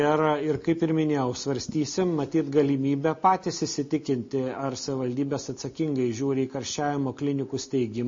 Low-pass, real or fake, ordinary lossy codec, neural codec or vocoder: 7.2 kHz; real; MP3, 32 kbps; none